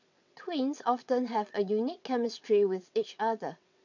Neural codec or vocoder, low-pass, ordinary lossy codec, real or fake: vocoder, 22.05 kHz, 80 mel bands, WaveNeXt; 7.2 kHz; none; fake